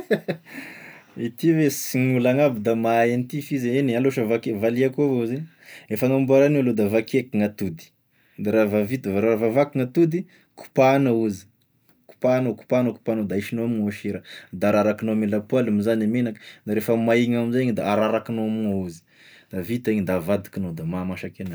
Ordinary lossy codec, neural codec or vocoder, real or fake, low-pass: none; none; real; none